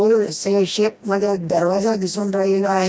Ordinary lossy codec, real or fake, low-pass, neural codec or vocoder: none; fake; none; codec, 16 kHz, 1 kbps, FreqCodec, smaller model